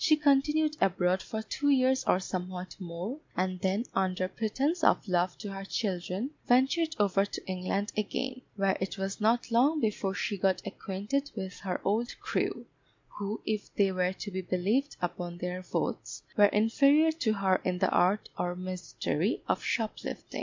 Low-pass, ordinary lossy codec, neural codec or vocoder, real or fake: 7.2 kHz; MP3, 64 kbps; none; real